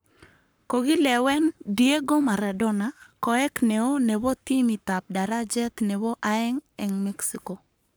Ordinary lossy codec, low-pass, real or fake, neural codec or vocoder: none; none; fake; codec, 44.1 kHz, 7.8 kbps, Pupu-Codec